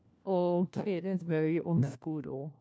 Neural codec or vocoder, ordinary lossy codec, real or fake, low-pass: codec, 16 kHz, 1 kbps, FunCodec, trained on LibriTTS, 50 frames a second; none; fake; none